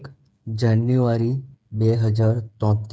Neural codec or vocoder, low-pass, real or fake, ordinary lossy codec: codec, 16 kHz, 8 kbps, FreqCodec, smaller model; none; fake; none